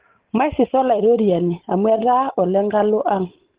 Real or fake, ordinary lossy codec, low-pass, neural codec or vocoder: real; Opus, 16 kbps; 3.6 kHz; none